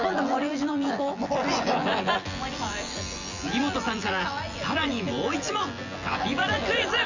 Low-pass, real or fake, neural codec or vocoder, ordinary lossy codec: 7.2 kHz; fake; vocoder, 24 kHz, 100 mel bands, Vocos; Opus, 64 kbps